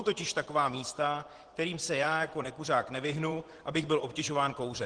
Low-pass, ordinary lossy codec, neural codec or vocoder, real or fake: 9.9 kHz; Opus, 16 kbps; vocoder, 24 kHz, 100 mel bands, Vocos; fake